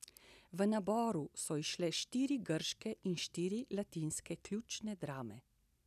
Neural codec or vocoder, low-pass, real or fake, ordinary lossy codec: none; 14.4 kHz; real; none